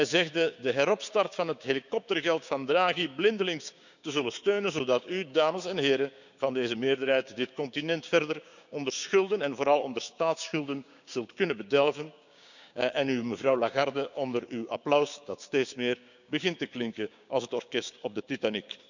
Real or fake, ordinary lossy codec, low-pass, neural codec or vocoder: fake; none; 7.2 kHz; codec, 16 kHz, 6 kbps, DAC